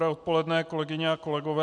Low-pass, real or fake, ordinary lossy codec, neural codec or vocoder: 10.8 kHz; real; MP3, 96 kbps; none